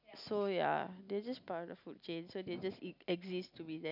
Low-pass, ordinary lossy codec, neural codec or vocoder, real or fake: 5.4 kHz; none; none; real